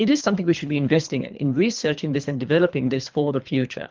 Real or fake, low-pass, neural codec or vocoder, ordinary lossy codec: fake; 7.2 kHz; codec, 24 kHz, 3 kbps, HILCodec; Opus, 32 kbps